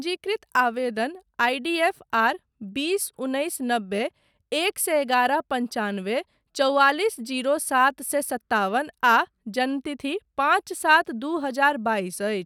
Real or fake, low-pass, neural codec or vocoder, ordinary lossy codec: real; none; none; none